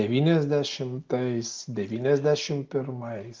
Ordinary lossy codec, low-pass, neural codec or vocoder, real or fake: Opus, 32 kbps; 7.2 kHz; none; real